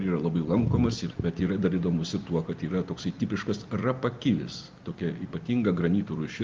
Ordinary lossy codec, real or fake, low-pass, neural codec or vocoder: Opus, 32 kbps; real; 7.2 kHz; none